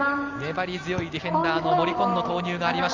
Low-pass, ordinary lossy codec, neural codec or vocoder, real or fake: 7.2 kHz; Opus, 32 kbps; none; real